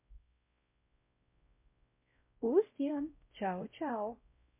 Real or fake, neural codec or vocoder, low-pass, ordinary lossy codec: fake; codec, 16 kHz, 0.5 kbps, X-Codec, WavLM features, trained on Multilingual LibriSpeech; 3.6 kHz; MP3, 24 kbps